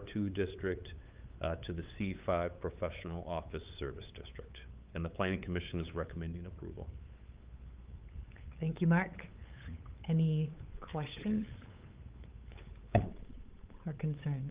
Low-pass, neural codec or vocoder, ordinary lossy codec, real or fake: 3.6 kHz; codec, 16 kHz, 8 kbps, FunCodec, trained on LibriTTS, 25 frames a second; Opus, 32 kbps; fake